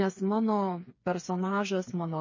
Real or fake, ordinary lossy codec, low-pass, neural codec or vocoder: fake; MP3, 32 kbps; 7.2 kHz; codec, 16 kHz, 4 kbps, FreqCodec, smaller model